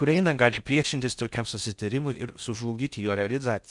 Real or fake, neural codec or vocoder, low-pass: fake; codec, 16 kHz in and 24 kHz out, 0.6 kbps, FocalCodec, streaming, 4096 codes; 10.8 kHz